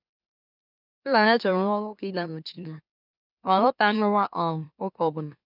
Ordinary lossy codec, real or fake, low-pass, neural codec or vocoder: none; fake; 5.4 kHz; autoencoder, 44.1 kHz, a latent of 192 numbers a frame, MeloTTS